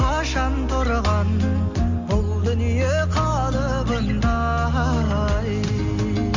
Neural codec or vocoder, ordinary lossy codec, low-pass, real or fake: none; Opus, 64 kbps; 7.2 kHz; real